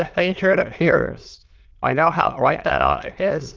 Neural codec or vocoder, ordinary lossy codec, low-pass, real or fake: autoencoder, 22.05 kHz, a latent of 192 numbers a frame, VITS, trained on many speakers; Opus, 32 kbps; 7.2 kHz; fake